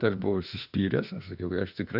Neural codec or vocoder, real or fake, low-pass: autoencoder, 48 kHz, 32 numbers a frame, DAC-VAE, trained on Japanese speech; fake; 5.4 kHz